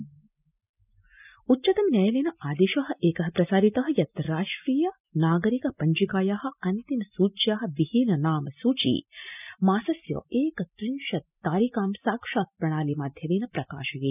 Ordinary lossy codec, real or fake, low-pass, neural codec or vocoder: none; real; 3.6 kHz; none